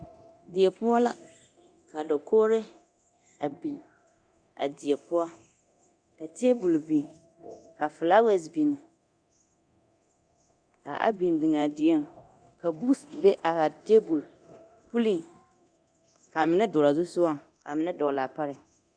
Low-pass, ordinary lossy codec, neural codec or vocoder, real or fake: 9.9 kHz; Opus, 64 kbps; codec, 24 kHz, 0.9 kbps, DualCodec; fake